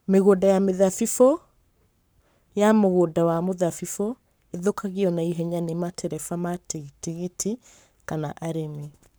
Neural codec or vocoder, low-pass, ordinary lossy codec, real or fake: codec, 44.1 kHz, 7.8 kbps, Pupu-Codec; none; none; fake